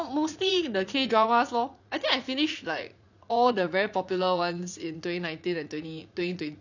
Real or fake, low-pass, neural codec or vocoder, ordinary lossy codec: fake; 7.2 kHz; vocoder, 44.1 kHz, 80 mel bands, Vocos; none